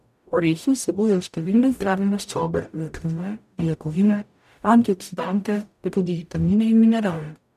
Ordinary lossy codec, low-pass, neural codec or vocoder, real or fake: none; 14.4 kHz; codec, 44.1 kHz, 0.9 kbps, DAC; fake